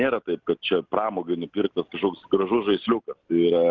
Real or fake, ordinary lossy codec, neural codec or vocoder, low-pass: real; Opus, 32 kbps; none; 7.2 kHz